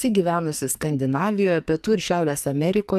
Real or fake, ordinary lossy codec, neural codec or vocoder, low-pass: fake; AAC, 96 kbps; codec, 44.1 kHz, 2.6 kbps, SNAC; 14.4 kHz